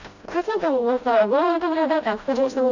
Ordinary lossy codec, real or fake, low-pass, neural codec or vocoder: none; fake; 7.2 kHz; codec, 16 kHz, 0.5 kbps, FreqCodec, smaller model